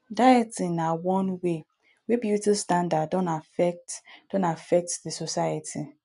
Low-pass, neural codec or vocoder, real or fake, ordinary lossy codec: 10.8 kHz; none; real; none